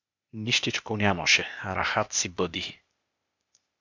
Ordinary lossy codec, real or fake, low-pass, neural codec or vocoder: MP3, 64 kbps; fake; 7.2 kHz; codec, 16 kHz, 0.8 kbps, ZipCodec